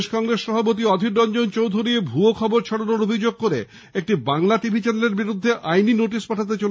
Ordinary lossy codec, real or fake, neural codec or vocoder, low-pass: none; real; none; none